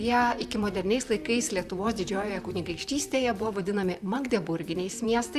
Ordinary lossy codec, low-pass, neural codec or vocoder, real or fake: Opus, 64 kbps; 14.4 kHz; vocoder, 44.1 kHz, 128 mel bands, Pupu-Vocoder; fake